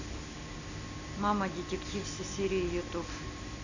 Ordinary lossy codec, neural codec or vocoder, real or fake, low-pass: none; none; real; 7.2 kHz